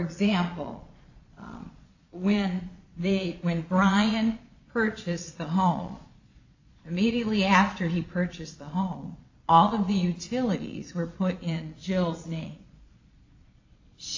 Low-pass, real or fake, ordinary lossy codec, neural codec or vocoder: 7.2 kHz; fake; AAC, 48 kbps; vocoder, 22.05 kHz, 80 mel bands, Vocos